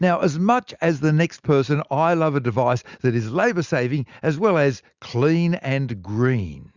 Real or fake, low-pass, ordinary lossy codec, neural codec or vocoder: real; 7.2 kHz; Opus, 64 kbps; none